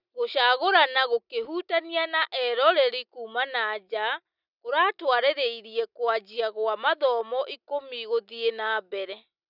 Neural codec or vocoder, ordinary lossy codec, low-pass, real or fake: none; none; 5.4 kHz; real